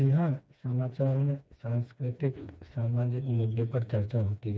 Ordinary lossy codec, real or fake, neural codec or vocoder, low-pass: none; fake; codec, 16 kHz, 2 kbps, FreqCodec, smaller model; none